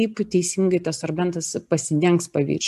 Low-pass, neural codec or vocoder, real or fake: 14.4 kHz; none; real